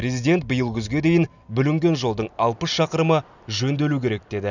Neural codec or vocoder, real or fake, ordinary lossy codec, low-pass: none; real; none; 7.2 kHz